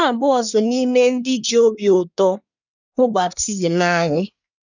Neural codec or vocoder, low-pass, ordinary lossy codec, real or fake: codec, 24 kHz, 1 kbps, SNAC; 7.2 kHz; none; fake